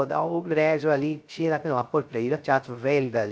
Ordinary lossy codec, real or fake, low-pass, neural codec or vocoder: none; fake; none; codec, 16 kHz, 0.3 kbps, FocalCodec